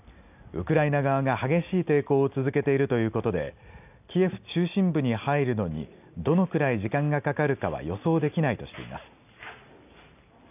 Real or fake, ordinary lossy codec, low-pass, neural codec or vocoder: real; none; 3.6 kHz; none